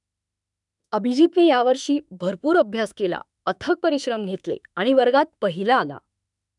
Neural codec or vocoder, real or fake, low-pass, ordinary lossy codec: autoencoder, 48 kHz, 32 numbers a frame, DAC-VAE, trained on Japanese speech; fake; 10.8 kHz; none